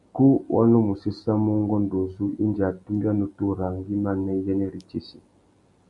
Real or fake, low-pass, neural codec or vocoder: real; 10.8 kHz; none